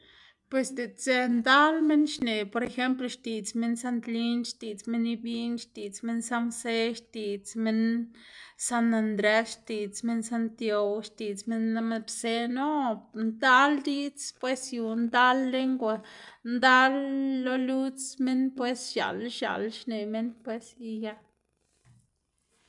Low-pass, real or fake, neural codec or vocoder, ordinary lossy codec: 10.8 kHz; real; none; MP3, 96 kbps